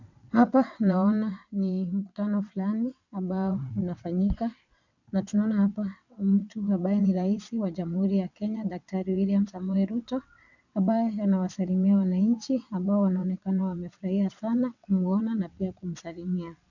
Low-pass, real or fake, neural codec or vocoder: 7.2 kHz; fake; vocoder, 22.05 kHz, 80 mel bands, WaveNeXt